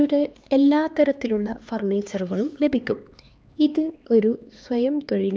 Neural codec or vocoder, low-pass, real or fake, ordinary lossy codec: codec, 16 kHz, 2 kbps, X-Codec, HuBERT features, trained on LibriSpeech; none; fake; none